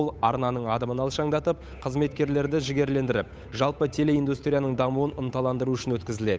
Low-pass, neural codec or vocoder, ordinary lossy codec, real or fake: none; codec, 16 kHz, 8 kbps, FunCodec, trained on Chinese and English, 25 frames a second; none; fake